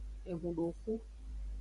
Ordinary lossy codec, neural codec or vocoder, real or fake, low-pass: Opus, 64 kbps; vocoder, 44.1 kHz, 128 mel bands every 512 samples, BigVGAN v2; fake; 10.8 kHz